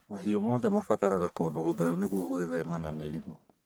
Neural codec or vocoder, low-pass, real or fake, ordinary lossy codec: codec, 44.1 kHz, 1.7 kbps, Pupu-Codec; none; fake; none